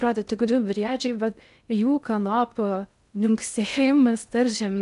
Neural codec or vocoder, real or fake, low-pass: codec, 16 kHz in and 24 kHz out, 0.6 kbps, FocalCodec, streaming, 2048 codes; fake; 10.8 kHz